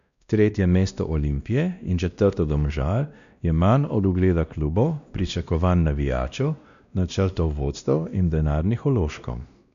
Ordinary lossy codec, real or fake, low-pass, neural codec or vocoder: none; fake; 7.2 kHz; codec, 16 kHz, 1 kbps, X-Codec, WavLM features, trained on Multilingual LibriSpeech